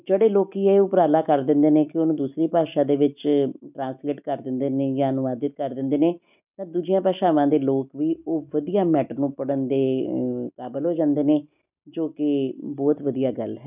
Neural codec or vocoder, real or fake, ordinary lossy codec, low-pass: none; real; none; 3.6 kHz